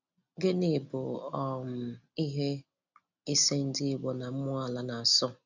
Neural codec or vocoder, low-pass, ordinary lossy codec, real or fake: none; 7.2 kHz; none; real